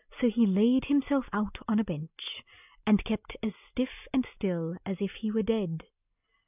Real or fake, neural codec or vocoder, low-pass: real; none; 3.6 kHz